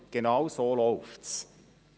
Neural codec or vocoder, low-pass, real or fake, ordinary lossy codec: none; none; real; none